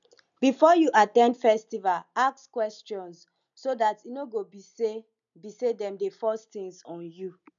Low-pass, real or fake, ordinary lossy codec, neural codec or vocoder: 7.2 kHz; real; none; none